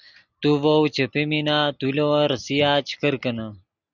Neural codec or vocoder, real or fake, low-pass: none; real; 7.2 kHz